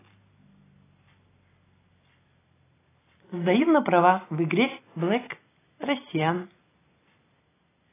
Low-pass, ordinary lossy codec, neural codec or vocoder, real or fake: 3.6 kHz; AAC, 16 kbps; none; real